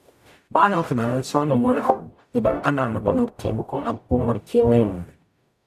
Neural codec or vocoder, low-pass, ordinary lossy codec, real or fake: codec, 44.1 kHz, 0.9 kbps, DAC; 14.4 kHz; none; fake